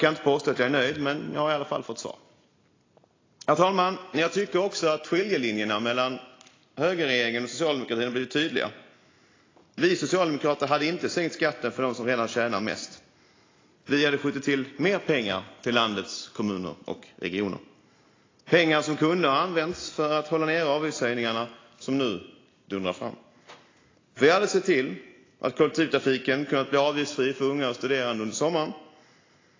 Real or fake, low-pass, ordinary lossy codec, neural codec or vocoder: real; 7.2 kHz; AAC, 32 kbps; none